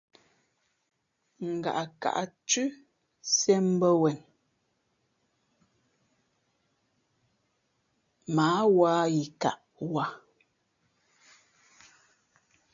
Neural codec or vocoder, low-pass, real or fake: none; 7.2 kHz; real